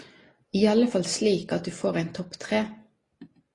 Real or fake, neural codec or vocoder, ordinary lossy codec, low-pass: real; none; AAC, 32 kbps; 10.8 kHz